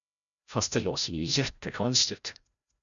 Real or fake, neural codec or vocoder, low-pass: fake; codec, 16 kHz, 0.5 kbps, FreqCodec, larger model; 7.2 kHz